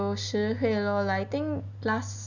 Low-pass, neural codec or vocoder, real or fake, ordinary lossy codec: 7.2 kHz; none; real; none